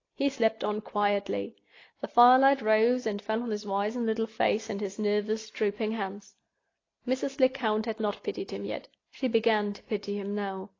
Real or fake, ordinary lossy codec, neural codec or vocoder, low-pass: real; AAC, 32 kbps; none; 7.2 kHz